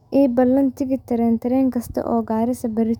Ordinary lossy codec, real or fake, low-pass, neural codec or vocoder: none; fake; 19.8 kHz; autoencoder, 48 kHz, 128 numbers a frame, DAC-VAE, trained on Japanese speech